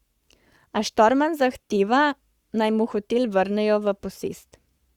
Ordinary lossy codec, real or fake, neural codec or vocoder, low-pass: Opus, 64 kbps; fake; codec, 44.1 kHz, 7.8 kbps, Pupu-Codec; 19.8 kHz